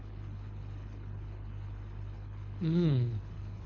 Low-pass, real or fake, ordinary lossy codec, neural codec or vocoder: 7.2 kHz; fake; none; codec, 24 kHz, 6 kbps, HILCodec